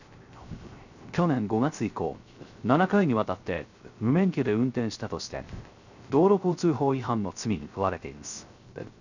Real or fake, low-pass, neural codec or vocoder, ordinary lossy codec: fake; 7.2 kHz; codec, 16 kHz, 0.3 kbps, FocalCodec; none